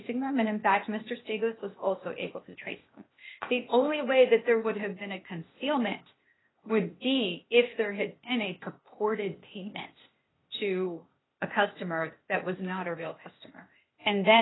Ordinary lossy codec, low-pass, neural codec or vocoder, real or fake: AAC, 16 kbps; 7.2 kHz; codec, 16 kHz, 0.8 kbps, ZipCodec; fake